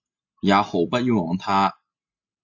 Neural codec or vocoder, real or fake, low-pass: none; real; 7.2 kHz